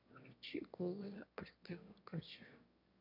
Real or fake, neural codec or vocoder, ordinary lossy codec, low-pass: fake; autoencoder, 22.05 kHz, a latent of 192 numbers a frame, VITS, trained on one speaker; MP3, 48 kbps; 5.4 kHz